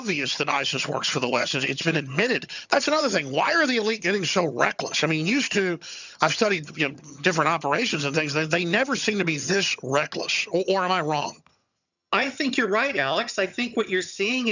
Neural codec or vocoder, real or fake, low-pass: vocoder, 22.05 kHz, 80 mel bands, HiFi-GAN; fake; 7.2 kHz